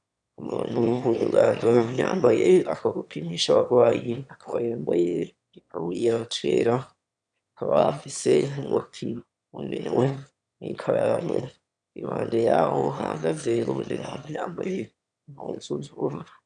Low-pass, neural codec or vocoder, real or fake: 9.9 kHz; autoencoder, 22.05 kHz, a latent of 192 numbers a frame, VITS, trained on one speaker; fake